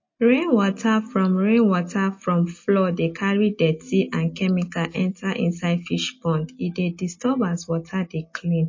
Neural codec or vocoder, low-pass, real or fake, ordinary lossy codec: none; 7.2 kHz; real; MP3, 32 kbps